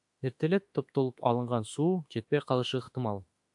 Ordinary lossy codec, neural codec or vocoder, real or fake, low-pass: AAC, 64 kbps; autoencoder, 48 kHz, 32 numbers a frame, DAC-VAE, trained on Japanese speech; fake; 10.8 kHz